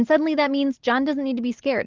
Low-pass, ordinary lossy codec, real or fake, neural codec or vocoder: 7.2 kHz; Opus, 16 kbps; real; none